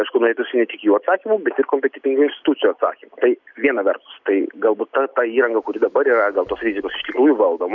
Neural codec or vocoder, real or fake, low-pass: none; real; 7.2 kHz